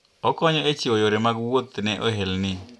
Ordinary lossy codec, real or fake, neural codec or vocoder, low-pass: none; real; none; none